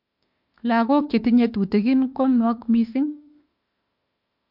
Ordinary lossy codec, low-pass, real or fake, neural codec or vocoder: MP3, 48 kbps; 5.4 kHz; fake; autoencoder, 48 kHz, 32 numbers a frame, DAC-VAE, trained on Japanese speech